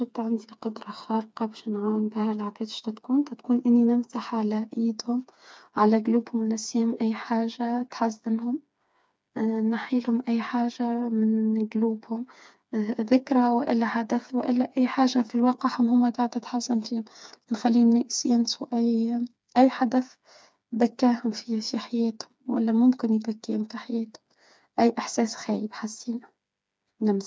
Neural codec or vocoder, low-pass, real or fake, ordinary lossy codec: codec, 16 kHz, 4 kbps, FreqCodec, smaller model; none; fake; none